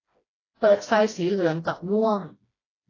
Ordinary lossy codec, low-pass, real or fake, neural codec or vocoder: AAC, 32 kbps; 7.2 kHz; fake; codec, 16 kHz, 1 kbps, FreqCodec, smaller model